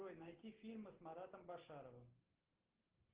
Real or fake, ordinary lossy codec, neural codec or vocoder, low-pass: real; Opus, 16 kbps; none; 3.6 kHz